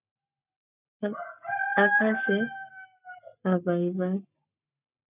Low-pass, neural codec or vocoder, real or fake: 3.6 kHz; codec, 44.1 kHz, 7.8 kbps, Pupu-Codec; fake